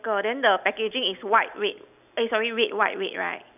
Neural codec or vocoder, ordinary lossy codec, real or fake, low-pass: none; none; real; 3.6 kHz